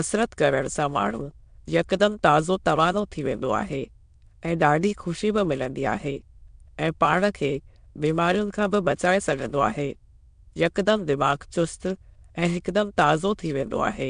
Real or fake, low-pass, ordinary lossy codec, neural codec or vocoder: fake; 9.9 kHz; MP3, 64 kbps; autoencoder, 22.05 kHz, a latent of 192 numbers a frame, VITS, trained on many speakers